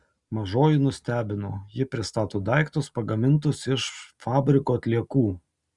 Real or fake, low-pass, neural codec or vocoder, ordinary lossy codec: real; 10.8 kHz; none; Opus, 64 kbps